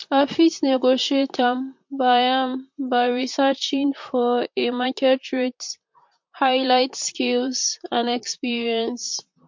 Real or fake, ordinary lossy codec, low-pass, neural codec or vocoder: fake; MP3, 48 kbps; 7.2 kHz; vocoder, 44.1 kHz, 128 mel bands, Pupu-Vocoder